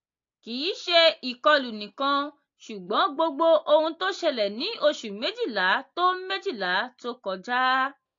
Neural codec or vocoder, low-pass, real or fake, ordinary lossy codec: none; 7.2 kHz; real; AAC, 48 kbps